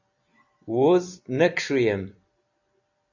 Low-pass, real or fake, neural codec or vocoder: 7.2 kHz; fake; vocoder, 44.1 kHz, 128 mel bands every 256 samples, BigVGAN v2